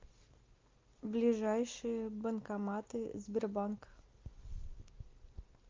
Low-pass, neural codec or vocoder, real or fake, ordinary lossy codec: 7.2 kHz; none; real; Opus, 24 kbps